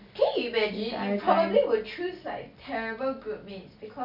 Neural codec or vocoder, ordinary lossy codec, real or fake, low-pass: none; none; real; 5.4 kHz